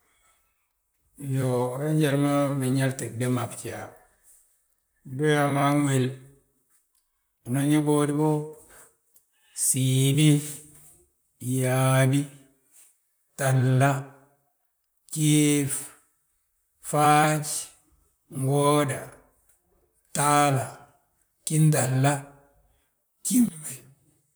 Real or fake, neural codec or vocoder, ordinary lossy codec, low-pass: fake; vocoder, 44.1 kHz, 128 mel bands, Pupu-Vocoder; none; none